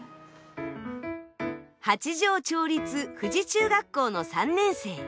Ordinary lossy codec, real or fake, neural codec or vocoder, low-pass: none; real; none; none